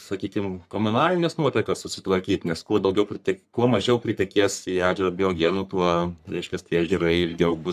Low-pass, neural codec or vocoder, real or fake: 14.4 kHz; codec, 44.1 kHz, 3.4 kbps, Pupu-Codec; fake